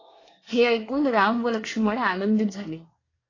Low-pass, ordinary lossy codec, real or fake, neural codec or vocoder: 7.2 kHz; AAC, 32 kbps; fake; codec, 24 kHz, 1 kbps, SNAC